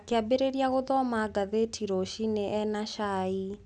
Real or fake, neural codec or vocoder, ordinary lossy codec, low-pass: real; none; none; none